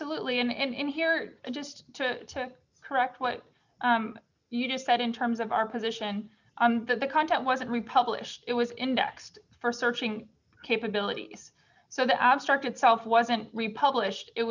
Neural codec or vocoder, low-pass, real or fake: none; 7.2 kHz; real